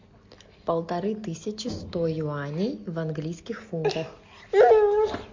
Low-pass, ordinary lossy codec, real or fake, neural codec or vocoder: 7.2 kHz; MP3, 48 kbps; real; none